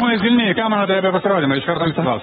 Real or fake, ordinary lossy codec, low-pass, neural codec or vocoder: fake; AAC, 16 kbps; 19.8 kHz; codec, 44.1 kHz, 7.8 kbps, Pupu-Codec